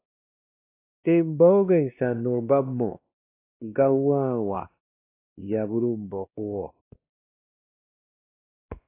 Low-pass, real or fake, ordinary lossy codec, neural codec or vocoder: 3.6 kHz; fake; AAC, 24 kbps; codec, 16 kHz, 1 kbps, X-Codec, WavLM features, trained on Multilingual LibriSpeech